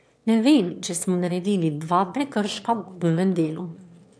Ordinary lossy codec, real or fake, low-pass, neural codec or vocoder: none; fake; none; autoencoder, 22.05 kHz, a latent of 192 numbers a frame, VITS, trained on one speaker